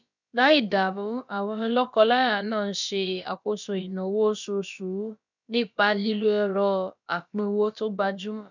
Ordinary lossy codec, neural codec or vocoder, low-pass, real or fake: none; codec, 16 kHz, about 1 kbps, DyCAST, with the encoder's durations; 7.2 kHz; fake